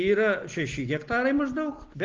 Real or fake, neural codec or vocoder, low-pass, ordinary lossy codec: real; none; 7.2 kHz; Opus, 16 kbps